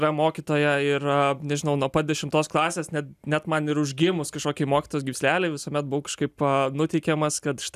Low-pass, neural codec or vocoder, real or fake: 14.4 kHz; none; real